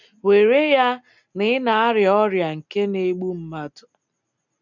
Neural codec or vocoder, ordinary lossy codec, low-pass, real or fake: none; none; 7.2 kHz; real